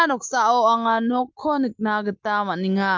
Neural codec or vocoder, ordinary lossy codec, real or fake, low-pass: none; Opus, 32 kbps; real; 7.2 kHz